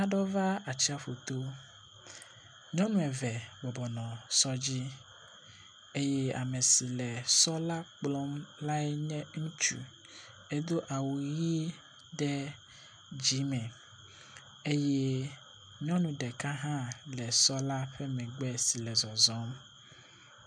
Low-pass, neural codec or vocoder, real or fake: 9.9 kHz; none; real